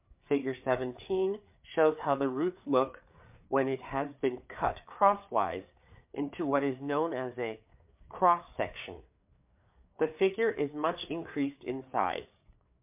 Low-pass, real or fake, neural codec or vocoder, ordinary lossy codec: 3.6 kHz; fake; codec, 16 kHz, 4 kbps, FreqCodec, larger model; MP3, 32 kbps